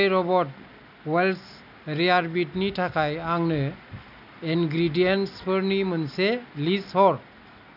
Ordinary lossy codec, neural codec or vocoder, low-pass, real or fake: none; none; 5.4 kHz; real